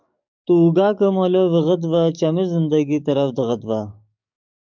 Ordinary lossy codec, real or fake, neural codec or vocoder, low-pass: MP3, 64 kbps; fake; codec, 44.1 kHz, 7.8 kbps, DAC; 7.2 kHz